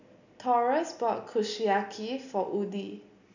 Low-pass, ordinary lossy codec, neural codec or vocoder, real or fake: 7.2 kHz; none; none; real